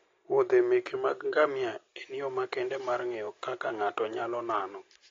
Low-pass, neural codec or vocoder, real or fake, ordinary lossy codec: 7.2 kHz; none; real; AAC, 32 kbps